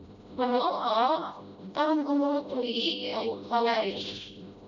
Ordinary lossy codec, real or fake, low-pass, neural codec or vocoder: none; fake; 7.2 kHz; codec, 16 kHz, 0.5 kbps, FreqCodec, smaller model